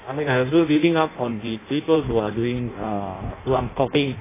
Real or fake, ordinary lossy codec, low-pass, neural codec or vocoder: fake; AAC, 16 kbps; 3.6 kHz; codec, 16 kHz in and 24 kHz out, 0.6 kbps, FireRedTTS-2 codec